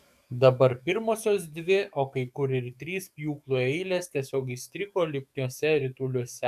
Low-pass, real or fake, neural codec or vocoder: 14.4 kHz; fake; codec, 44.1 kHz, 7.8 kbps, DAC